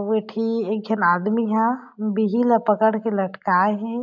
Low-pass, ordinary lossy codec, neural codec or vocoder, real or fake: 7.2 kHz; none; none; real